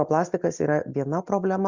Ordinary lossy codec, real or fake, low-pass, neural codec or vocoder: Opus, 64 kbps; real; 7.2 kHz; none